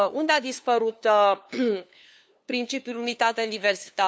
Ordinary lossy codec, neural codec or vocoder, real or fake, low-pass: none; codec, 16 kHz, 2 kbps, FunCodec, trained on LibriTTS, 25 frames a second; fake; none